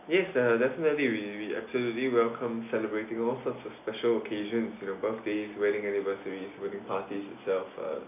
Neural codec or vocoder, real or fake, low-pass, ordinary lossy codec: none; real; 3.6 kHz; none